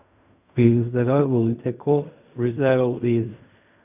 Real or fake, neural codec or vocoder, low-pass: fake; codec, 16 kHz in and 24 kHz out, 0.4 kbps, LongCat-Audio-Codec, fine tuned four codebook decoder; 3.6 kHz